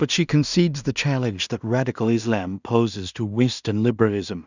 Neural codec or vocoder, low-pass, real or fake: codec, 16 kHz in and 24 kHz out, 0.4 kbps, LongCat-Audio-Codec, two codebook decoder; 7.2 kHz; fake